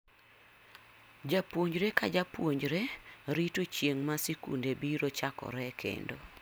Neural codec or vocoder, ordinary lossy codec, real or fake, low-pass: none; none; real; none